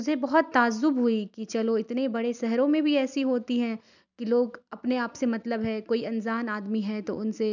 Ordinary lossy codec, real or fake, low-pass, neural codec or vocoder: none; real; 7.2 kHz; none